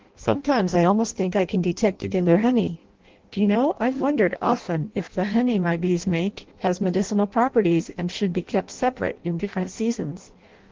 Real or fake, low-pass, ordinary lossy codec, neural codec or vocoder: fake; 7.2 kHz; Opus, 16 kbps; codec, 16 kHz in and 24 kHz out, 0.6 kbps, FireRedTTS-2 codec